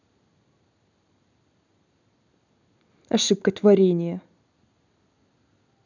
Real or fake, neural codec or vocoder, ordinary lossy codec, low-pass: real; none; none; 7.2 kHz